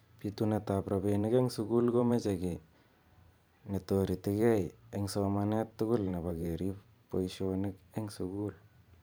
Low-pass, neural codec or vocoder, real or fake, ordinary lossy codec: none; vocoder, 44.1 kHz, 128 mel bands every 512 samples, BigVGAN v2; fake; none